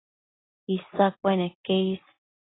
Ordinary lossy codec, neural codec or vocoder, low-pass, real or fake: AAC, 16 kbps; none; 7.2 kHz; real